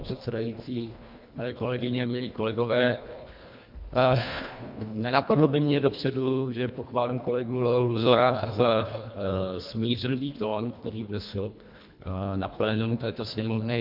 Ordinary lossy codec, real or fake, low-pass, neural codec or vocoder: MP3, 48 kbps; fake; 5.4 kHz; codec, 24 kHz, 1.5 kbps, HILCodec